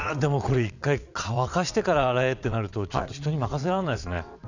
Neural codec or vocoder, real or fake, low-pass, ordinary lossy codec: vocoder, 22.05 kHz, 80 mel bands, WaveNeXt; fake; 7.2 kHz; none